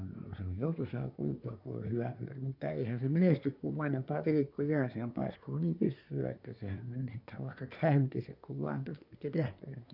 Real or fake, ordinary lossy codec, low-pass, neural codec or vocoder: fake; none; 5.4 kHz; codec, 24 kHz, 1 kbps, SNAC